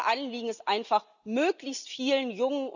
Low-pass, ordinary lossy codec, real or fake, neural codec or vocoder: 7.2 kHz; none; real; none